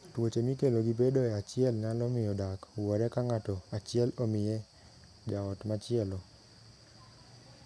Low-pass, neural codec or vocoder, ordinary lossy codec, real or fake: none; none; none; real